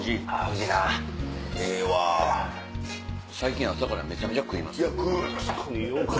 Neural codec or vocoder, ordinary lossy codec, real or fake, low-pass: none; none; real; none